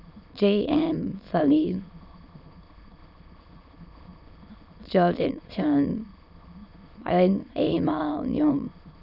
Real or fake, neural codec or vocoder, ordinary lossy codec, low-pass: fake; autoencoder, 22.05 kHz, a latent of 192 numbers a frame, VITS, trained on many speakers; none; 5.4 kHz